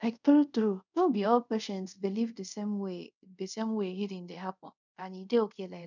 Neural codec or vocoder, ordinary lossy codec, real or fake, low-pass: codec, 24 kHz, 0.5 kbps, DualCodec; none; fake; 7.2 kHz